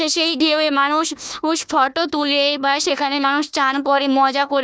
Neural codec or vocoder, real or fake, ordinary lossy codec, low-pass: codec, 16 kHz, 1 kbps, FunCodec, trained on Chinese and English, 50 frames a second; fake; none; none